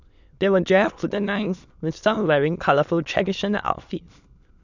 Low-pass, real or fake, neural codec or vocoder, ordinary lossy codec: 7.2 kHz; fake; autoencoder, 22.05 kHz, a latent of 192 numbers a frame, VITS, trained on many speakers; none